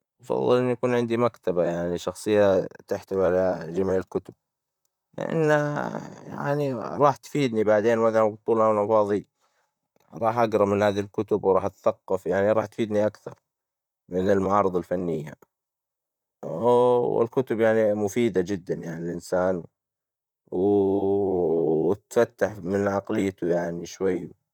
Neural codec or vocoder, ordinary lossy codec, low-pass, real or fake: vocoder, 44.1 kHz, 128 mel bands, Pupu-Vocoder; none; 19.8 kHz; fake